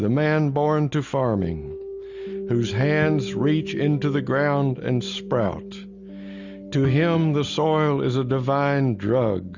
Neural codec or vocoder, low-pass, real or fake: none; 7.2 kHz; real